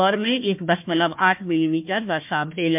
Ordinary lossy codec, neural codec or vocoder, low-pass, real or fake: MP3, 32 kbps; codec, 16 kHz, 1 kbps, FunCodec, trained on Chinese and English, 50 frames a second; 3.6 kHz; fake